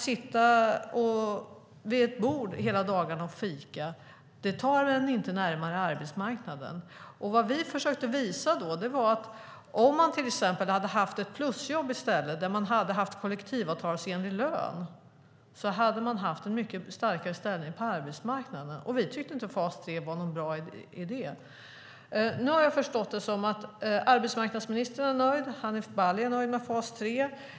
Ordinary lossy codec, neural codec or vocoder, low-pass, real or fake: none; none; none; real